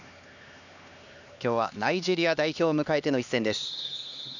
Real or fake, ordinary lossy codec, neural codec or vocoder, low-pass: fake; none; codec, 16 kHz, 2 kbps, X-Codec, HuBERT features, trained on LibriSpeech; 7.2 kHz